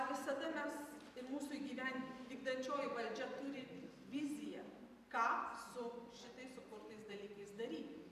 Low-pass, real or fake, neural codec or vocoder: 14.4 kHz; fake; vocoder, 44.1 kHz, 128 mel bands every 512 samples, BigVGAN v2